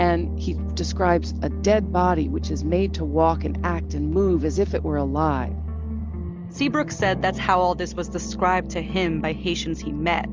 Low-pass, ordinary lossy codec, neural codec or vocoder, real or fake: 7.2 kHz; Opus, 32 kbps; none; real